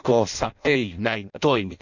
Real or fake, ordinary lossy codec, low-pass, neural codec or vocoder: fake; none; 7.2 kHz; codec, 16 kHz in and 24 kHz out, 0.6 kbps, FireRedTTS-2 codec